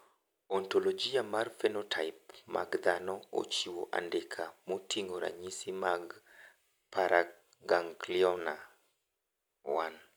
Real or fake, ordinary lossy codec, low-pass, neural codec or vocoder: real; none; none; none